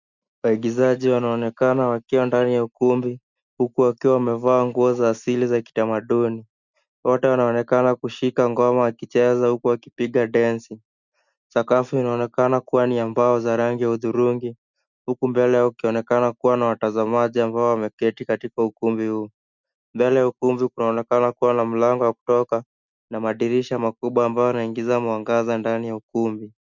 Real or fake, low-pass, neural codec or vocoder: real; 7.2 kHz; none